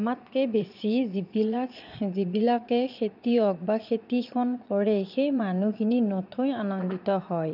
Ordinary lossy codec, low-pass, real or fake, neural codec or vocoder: none; 5.4 kHz; fake; vocoder, 22.05 kHz, 80 mel bands, Vocos